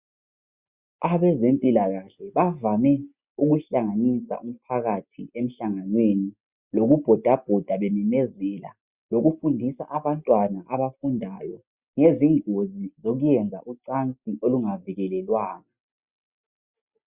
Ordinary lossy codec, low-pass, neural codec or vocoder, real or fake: Opus, 64 kbps; 3.6 kHz; none; real